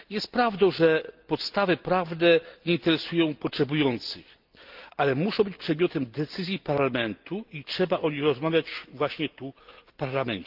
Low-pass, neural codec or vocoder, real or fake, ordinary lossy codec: 5.4 kHz; none; real; Opus, 32 kbps